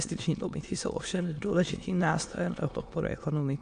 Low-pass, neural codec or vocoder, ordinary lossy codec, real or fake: 9.9 kHz; autoencoder, 22.05 kHz, a latent of 192 numbers a frame, VITS, trained on many speakers; AAC, 64 kbps; fake